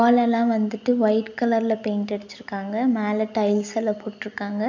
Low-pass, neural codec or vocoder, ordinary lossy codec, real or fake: 7.2 kHz; none; none; real